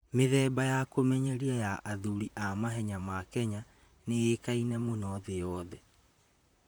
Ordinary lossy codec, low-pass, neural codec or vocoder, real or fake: none; none; vocoder, 44.1 kHz, 128 mel bands, Pupu-Vocoder; fake